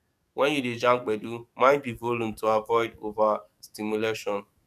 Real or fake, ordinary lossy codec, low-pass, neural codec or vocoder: fake; none; 14.4 kHz; codec, 44.1 kHz, 7.8 kbps, DAC